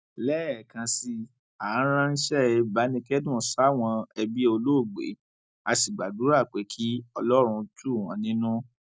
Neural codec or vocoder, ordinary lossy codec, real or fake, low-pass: none; none; real; none